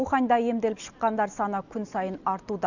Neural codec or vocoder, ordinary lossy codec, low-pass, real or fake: none; none; 7.2 kHz; real